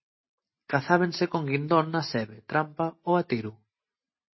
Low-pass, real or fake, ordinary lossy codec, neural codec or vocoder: 7.2 kHz; real; MP3, 24 kbps; none